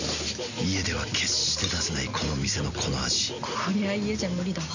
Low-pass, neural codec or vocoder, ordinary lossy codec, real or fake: 7.2 kHz; none; none; real